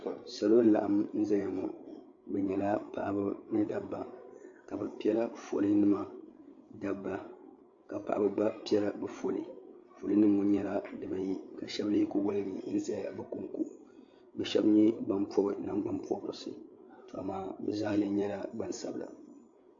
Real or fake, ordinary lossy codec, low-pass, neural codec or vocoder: fake; AAC, 48 kbps; 7.2 kHz; codec, 16 kHz, 8 kbps, FreqCodec, larger model